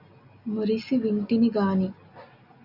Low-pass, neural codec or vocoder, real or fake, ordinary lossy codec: 5.4 kHz; none; real; Opus, 64 kbps